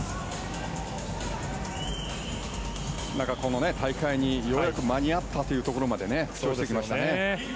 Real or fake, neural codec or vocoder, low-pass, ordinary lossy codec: real; none; none; none